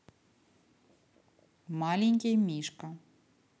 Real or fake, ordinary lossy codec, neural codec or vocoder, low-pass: real; none; none; none